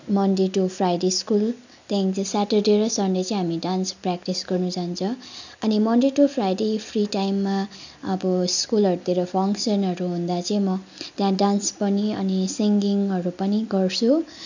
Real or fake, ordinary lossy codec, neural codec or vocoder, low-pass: real; none; none; 7.2 kHz